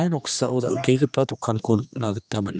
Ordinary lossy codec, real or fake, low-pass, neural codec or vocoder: none; fake; none; codec, 16 kHz, 2 kbps, X-Codec, HuBERT features, trained on general audio